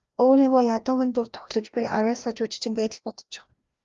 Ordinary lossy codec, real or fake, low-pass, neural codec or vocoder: Opus, 32 kbps; fake; 7.2 kHz; codec, 16 kHz, 1 kbps, FreqCodec, larger model